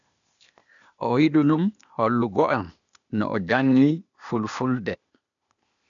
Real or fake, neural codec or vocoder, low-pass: fake; codec, 16 kHz, 0.8 kbps, ZipCodec; 7.2 kHz